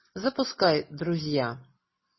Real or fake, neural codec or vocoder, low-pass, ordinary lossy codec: real; none; 7.2 kHz; MP3, 24 kbps